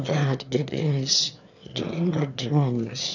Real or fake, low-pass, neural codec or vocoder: fake; 7.2 kHz; autoencoder, 22.05 kHz, a latent of 192 numbers a frame, VITS, trained on one speaker